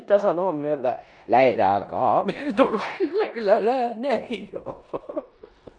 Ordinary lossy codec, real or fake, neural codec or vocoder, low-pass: none; fake; codec, 16 kHz in and 24 kHz out, 0.9 kbps, LongCat-Audio-Codec, four codebook decoder; 9.9 kHz